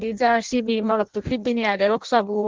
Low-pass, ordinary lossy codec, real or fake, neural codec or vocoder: 7.2 kHz; Opus, 16 kbps; fake; codec, 16 kHz in and 24 kHz out, 0.6 kbps, FireRedTTS-2 codec